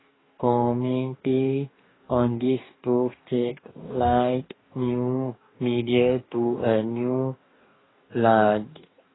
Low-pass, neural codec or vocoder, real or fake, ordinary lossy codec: 7.2 kHz; codec, 44.1 kHz, 2.6 kbps, DAC; fake; AAC, 16 kbps